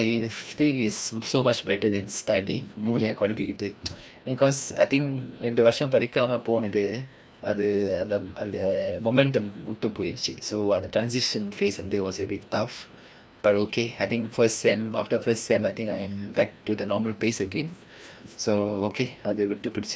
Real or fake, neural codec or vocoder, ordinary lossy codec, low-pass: fake; codec, 16 kHz, 1 kbps, FreqCodec, larger model; none; none